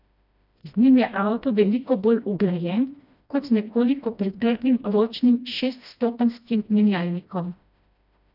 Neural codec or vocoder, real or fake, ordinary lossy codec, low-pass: codec, 16 kHz, 1 kbps, FreqCodec, smaller model; fake; none; 5.4 kHz